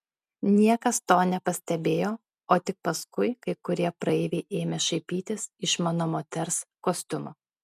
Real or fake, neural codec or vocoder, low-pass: real; none; 14.4 kHz